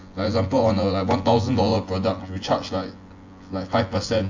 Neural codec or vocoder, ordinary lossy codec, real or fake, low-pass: vocoder, 24 kHz, 100 mel bands, Vocos; AAC, 48 kbps; fake; 7.2 kHz